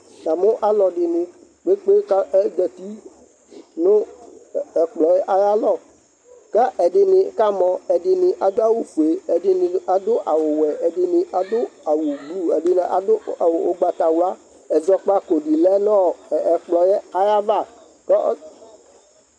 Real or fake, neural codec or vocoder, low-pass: real; none; 9.9 kHz